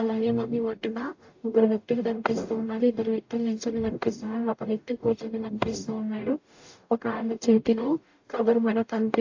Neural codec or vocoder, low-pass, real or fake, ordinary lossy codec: codec, 44.1 kHz, 0.9 kbps, DAC; 7.2 kHz; fake; AAC, 48 kbps